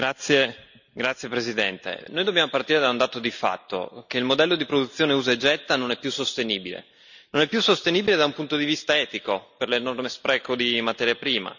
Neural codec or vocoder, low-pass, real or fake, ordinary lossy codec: none; 7.2 kHz; real; none